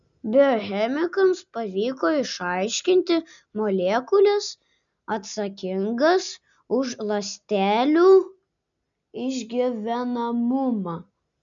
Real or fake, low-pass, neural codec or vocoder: real; 7.2 kHz; none